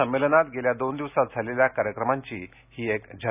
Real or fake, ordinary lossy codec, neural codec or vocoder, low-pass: real; none; none; 3.6 kHz